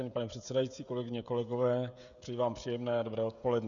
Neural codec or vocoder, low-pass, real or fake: codec, 16 kHz, 16 kbps, FreqCodec, smaller model; 7.2 kHz; fake